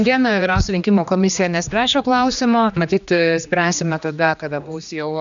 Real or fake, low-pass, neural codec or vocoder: fake; 7.2 kHz; codec, 16 kHz, 2 kbps, X-Codec, HuBERT features, trained on general audio